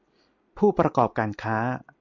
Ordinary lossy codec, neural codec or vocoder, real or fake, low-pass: MP3, 48 kbps; none; real; 7.2 kHz